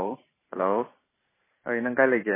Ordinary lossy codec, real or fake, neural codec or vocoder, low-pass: MP3, 16 kbps; fake; vocoder, 44.1 kHz, 128 mel bands every 512 samples, BigVGAN v2; 3.6 kHz